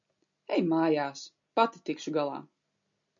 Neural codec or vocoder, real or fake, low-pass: none; real; 7.2 kHz